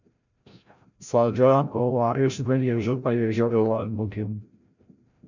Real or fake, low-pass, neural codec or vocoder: fake; 7.2 kHz; codec, 16 kHz, 0.5 kbps, FreqCodec, larger model